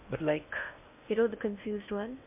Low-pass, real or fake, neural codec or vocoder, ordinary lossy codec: 3.6 kHz; fake; codec, 16 kHz in and 24 kHz out, 0.8 kbps, FocalCodec, streaming, 65536 codes; MP3, 32 kbps